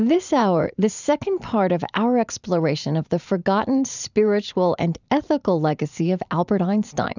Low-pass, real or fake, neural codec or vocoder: 7.2 kHz; fake; vocoder, 44.1 kHz, 128 mel bands every 512 samples, BigVGAN v2